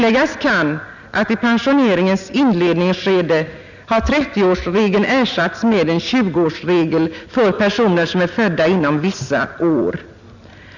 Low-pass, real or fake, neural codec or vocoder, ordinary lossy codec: 7.2 kHz; real; none; none